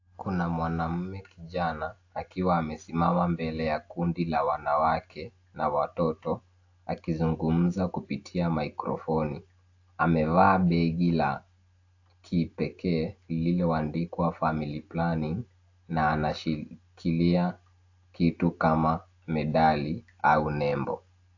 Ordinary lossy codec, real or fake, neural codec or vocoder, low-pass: AAC, 48 kbps; real; none; 7.2 kHz